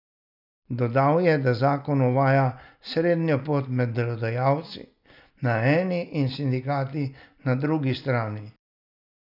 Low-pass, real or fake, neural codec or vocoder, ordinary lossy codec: 5.4 kHz; real; none; none